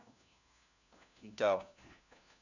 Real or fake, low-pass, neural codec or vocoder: fake; 7.2 kHz; codec, 16 kHz, 1 kbps, FunCodec, trained on LibriTTS, 50 frames a second